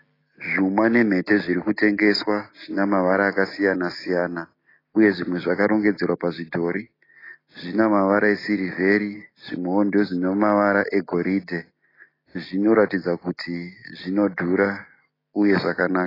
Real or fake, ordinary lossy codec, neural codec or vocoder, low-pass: real; AAC, 24 kbps; none; 5.4 kHz